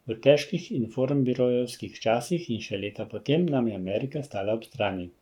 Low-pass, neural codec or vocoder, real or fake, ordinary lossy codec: 19.8 kHz; codec, 44.1 kHz, 7.8 kbps, Pupu-Codec; fake; none